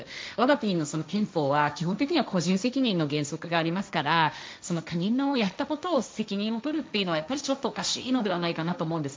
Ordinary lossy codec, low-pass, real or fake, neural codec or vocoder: none; none; fake; codec, 16 kHz, 1.1 kbps, Voila-Tokenizer